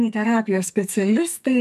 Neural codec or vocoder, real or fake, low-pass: codec, 44.1 kHz, 2.6 kbps, SNAC; fake; 14.4 kHz